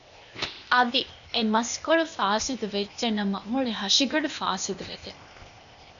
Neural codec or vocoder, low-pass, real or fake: codec, 16 kHz, 0.8 kbps, ZipCodec; 7.2 kHz; fake